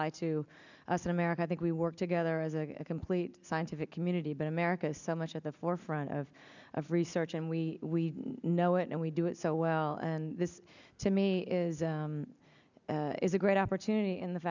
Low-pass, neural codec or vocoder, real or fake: 7.2 kHz; none; real